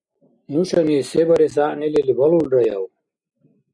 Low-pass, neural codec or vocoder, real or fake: 9.9 kHz; none; real